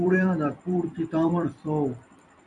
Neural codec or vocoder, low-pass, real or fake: vocoder, 44.1 kHz, 128 mel bands every 256 samples, BigVGAN v2; 10.8 kHz; fake